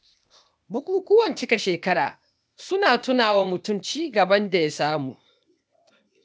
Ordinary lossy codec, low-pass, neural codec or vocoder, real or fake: none; none; codec, 16 kHz, 0.8 kbps, ZipCodec; fake